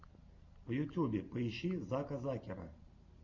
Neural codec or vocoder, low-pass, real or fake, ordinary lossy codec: none; 7.2 kHz; real; MP3, 48 kbps